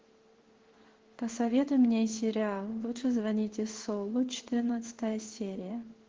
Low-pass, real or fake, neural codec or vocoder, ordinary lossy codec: 7.2 kHz; fake; codec, 16 kHz in and 24 kHz out, 1 kbps, XY-Tokenizer; Opus, 16 kbps